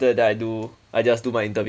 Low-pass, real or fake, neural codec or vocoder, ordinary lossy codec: none; real; none; none